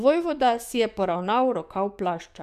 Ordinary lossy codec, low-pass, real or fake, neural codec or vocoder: none; 14.4 kHz; fake; codec, 44.1 kHz, 7.8 kbps, DAC